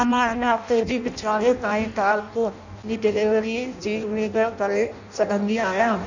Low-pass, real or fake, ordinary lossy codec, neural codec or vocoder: 7.2 kHz; fake; none; codec, 16 kHz in and 24 kHz out, 0.6 kbps, FireRedTTS-2 codec